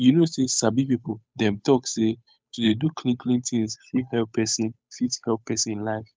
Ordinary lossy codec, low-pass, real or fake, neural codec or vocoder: none; none; fake; codec, 16 kHz, 8 kbps, FunCodec, trained on Chinese and English, 25 frames a second